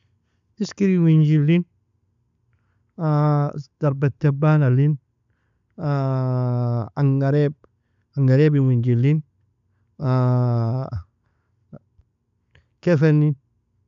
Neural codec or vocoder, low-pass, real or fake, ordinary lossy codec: none; 7.2 kHz; real; none